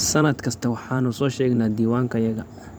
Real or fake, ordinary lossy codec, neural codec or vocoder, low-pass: fake; none; vocoder, 44.1 kHz, 128 mel bands every 512 samples, BigVGAN v2; none